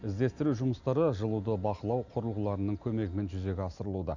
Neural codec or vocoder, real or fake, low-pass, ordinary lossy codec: none; real; 7.2 kHz; none